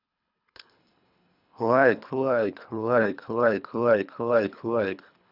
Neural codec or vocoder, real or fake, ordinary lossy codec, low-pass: codec, 24 kHz, 3 kbps, HILCodec; fake; none; 5.4 kHz